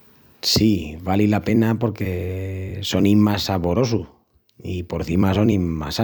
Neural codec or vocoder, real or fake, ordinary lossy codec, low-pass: vocoder, 44.1 kHz, 128 mel bands every 256 samples, BigVGAN v2; fake; none; none